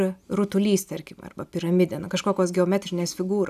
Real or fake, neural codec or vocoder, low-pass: real; none; 14.4 kHz